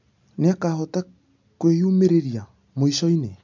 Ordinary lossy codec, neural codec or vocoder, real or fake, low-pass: MP3, 64 kbps; none; real; 7.2 kHz